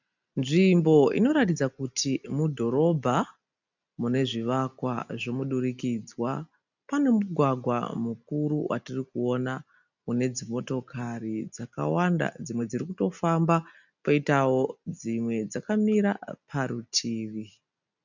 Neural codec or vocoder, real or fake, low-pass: none; real; 7.2 kHz